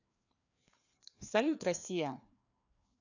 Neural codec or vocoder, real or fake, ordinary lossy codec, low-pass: codec, 16 kHz, 2 kbps, FreqCodec, larger model; fake; none; 7.2 kHz